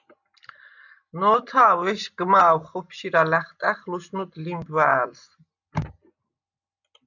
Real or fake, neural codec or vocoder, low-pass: real; none; 7.2 kHz